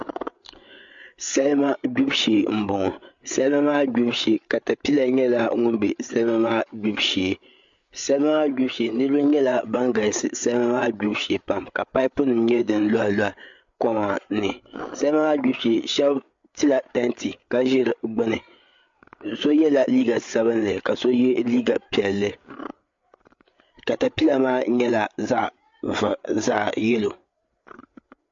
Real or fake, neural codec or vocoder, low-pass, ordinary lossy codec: fake; codec, 16 kHz, 8 kbps, FreqCodec, larger model; 7.2 kHz; MP3, 48 kbps